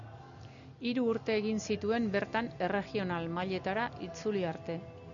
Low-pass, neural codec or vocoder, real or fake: 7.2 kHz; none; real